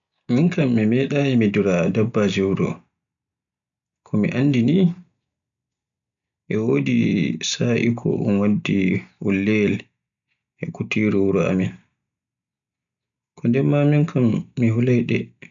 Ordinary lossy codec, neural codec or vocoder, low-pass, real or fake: none; none; 7.2 kHz; real